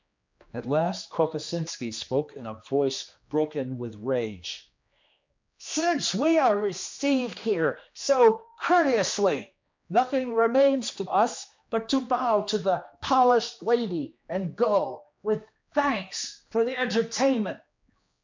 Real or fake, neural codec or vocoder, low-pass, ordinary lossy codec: fake; codec, 16 kHz, 2 kbps, X-Codec, HuBERT features, trained on general audio; 7.2 kHz; MP3, 64 kbps